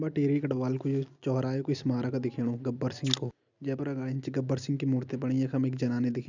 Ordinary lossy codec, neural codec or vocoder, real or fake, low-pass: none; none; real; 7.2 kHz